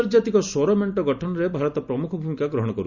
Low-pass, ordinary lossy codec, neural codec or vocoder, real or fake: 7.2 kHz; none; none; real